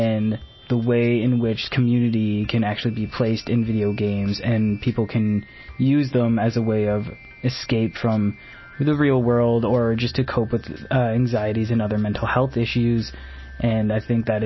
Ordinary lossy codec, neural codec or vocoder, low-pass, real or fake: MP3, 24 kbps; none; 7.2 kHz; real